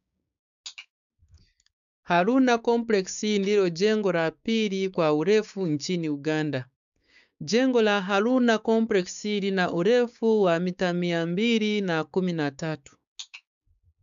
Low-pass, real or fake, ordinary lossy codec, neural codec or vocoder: 7.2 kHz; fake; AAC, 96 kbps; codec, 16 kHz, 6 kbps, DAC